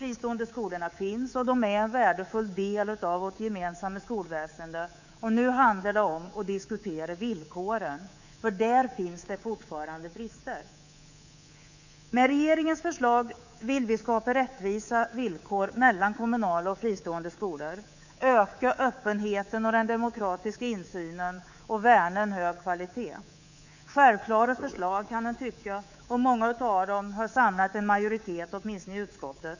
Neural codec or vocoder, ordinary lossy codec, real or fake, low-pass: codec, 24 kHz, 3.1 kbps, DualCodec; none; fake; 7.2 kHz